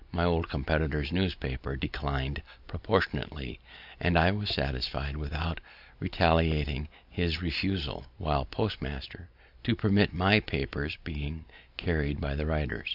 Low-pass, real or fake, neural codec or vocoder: 5.4 kHz; real; none